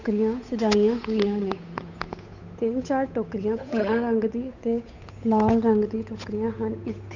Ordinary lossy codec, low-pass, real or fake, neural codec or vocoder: none; 7.2 kHz; fake; codec, 16 kHz, 8 kbps, FunCodec, trained on Chinese and English, 25 frames a second